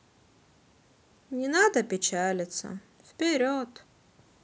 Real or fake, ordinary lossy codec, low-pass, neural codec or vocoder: real; none; none; none